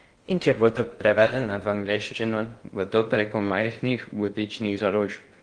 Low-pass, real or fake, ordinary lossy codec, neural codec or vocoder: 9.9 kHz; fake; Opus, 24 kbps; codec, 16 kHz in and 24 kHz out, 0.6 kbps, FocalCodec, streaming, 2048 codes